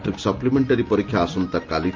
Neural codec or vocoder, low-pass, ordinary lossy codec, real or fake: none; 7.2 kHz; Opus, 32 kbps; real